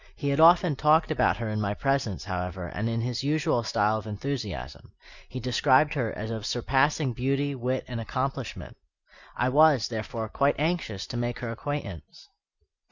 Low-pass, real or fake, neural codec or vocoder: 7.2 kHz; real; none